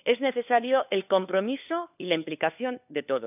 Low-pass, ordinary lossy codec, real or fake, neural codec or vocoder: 3.6 kHz; none; fake; codec, 16 kHz, 4 kbps, FunCodec, trained on LibriTTS, 50 frames a second